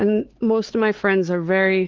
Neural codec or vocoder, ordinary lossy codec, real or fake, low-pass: codec, 16 kHz, 4 kbps, X-Codec, HuBERT features, trained on LibriSpeech; Opus, 16 kbps; fake; 7.2 kHz